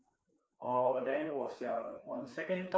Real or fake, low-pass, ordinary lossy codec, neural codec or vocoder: fake; none; none; codec, 16 kHz, 2 kbps, FreqCodec, larger model